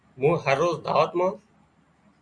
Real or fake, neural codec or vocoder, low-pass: real; none; 9.9 kHz